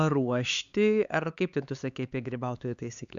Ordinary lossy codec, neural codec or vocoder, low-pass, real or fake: Opus, 64 kbps; codec, 16 kHz, 4 kbps, X-Codec, HuBERT features, trained on LibriSpeech; 7.2 kHz; fake